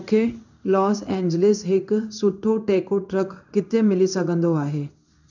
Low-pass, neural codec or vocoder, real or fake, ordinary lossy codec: 7.2 kHz; codec, 16 kHz in and 24 kHz out, 1 kbps, XY-Tokenizer; fake; none